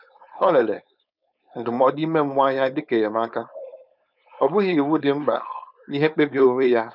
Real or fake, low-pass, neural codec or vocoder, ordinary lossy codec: fake; 5.4 kHz; codec, 16 kHz, 4.8 kbps, FACodec; none